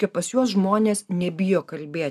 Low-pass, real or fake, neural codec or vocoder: 14.4 kHz; real; none